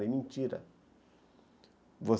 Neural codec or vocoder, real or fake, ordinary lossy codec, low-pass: none; real; none; none